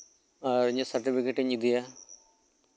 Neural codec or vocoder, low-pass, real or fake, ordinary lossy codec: none; none; real; none